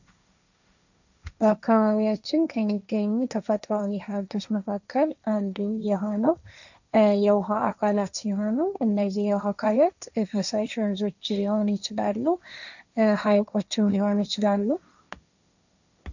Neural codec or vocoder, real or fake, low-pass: codec, 16 kHz, 1.1 kbps, Voila-Tokenizer; fake; 7.2 kHz